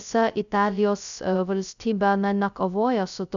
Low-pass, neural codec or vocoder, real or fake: 7.2 kHz; codec, 16 kHz, 0.2 kbps, FocalCodec; fake